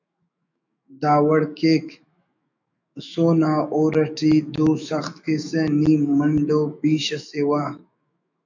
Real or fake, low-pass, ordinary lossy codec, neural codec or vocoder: fake; 7.2 kHz; MP3, 64 kbps; autoencoder, 48 kHz, 128 numbers a frame, DAC-VAE, trained on Japanese speech